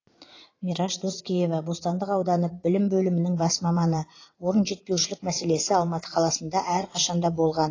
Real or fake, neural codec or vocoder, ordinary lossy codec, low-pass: fake; vocoder, 44.1 kHz, 80 mel bands, Vocos; AAC, 32 kbps; 7.2 kHz